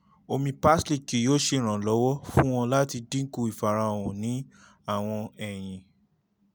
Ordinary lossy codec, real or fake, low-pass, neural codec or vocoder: none; real; none; none